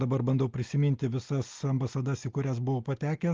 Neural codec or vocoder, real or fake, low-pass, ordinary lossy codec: none; real; 7.2 kHz; Opus, 32 kbps